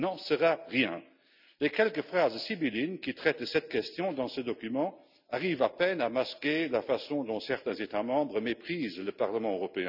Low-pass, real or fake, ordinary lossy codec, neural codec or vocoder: 5.4 kHz; real; none; none